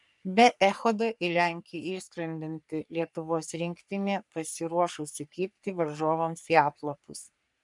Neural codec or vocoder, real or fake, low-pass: codec, 44.1 kHz, 3.4 kbps, Pupu-Codec; fake; 10.8 kHz